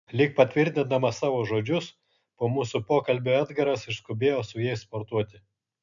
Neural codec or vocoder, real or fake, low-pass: none; real; 7.2 kHz